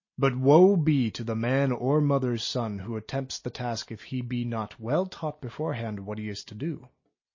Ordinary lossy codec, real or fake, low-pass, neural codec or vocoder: MP3, 32 kbps; real; 7.2 kHz; none